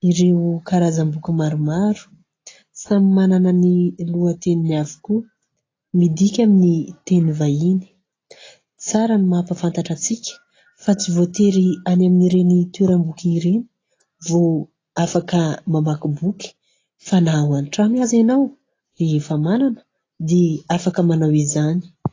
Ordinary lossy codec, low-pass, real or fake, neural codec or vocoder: AAC, 32 kbps; 7.2 kHz; real; none